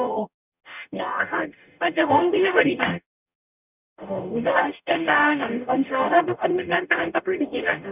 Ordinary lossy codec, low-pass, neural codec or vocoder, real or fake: none; 3.6 kHz; codec, 44.1 kHz, 0.9 kbps, DAC; fake